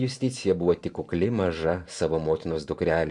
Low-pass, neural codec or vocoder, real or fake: 10.8 kHz; vocoder, 44.1 kHz, 128 mel bands every 512 samples, BigVGAN v2; fake